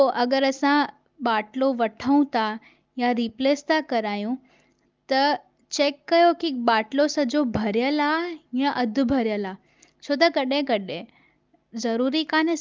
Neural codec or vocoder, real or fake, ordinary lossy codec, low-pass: none; real; Opus, 32 kbps; 7.2 kHz